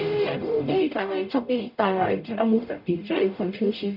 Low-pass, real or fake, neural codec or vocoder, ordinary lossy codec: 5.4 kHz; fake; codec, 44.1 kHz, 0.9 kbps, DAC; none